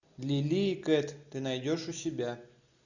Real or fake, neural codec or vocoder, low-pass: real; none; 7.2 kHz